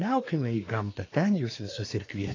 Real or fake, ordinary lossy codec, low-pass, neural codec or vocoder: fake; AAC, 32 kbps; 7.2 kHz; codec, 24 kHz, 1 kbps, SNAC